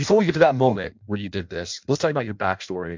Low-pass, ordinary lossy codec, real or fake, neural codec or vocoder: 7.2 kHz; MP3, 64 kbps; fake; codec, 16 kHz in and 24 kHz out, 0.6 kbps, FireRedTTS-2 codec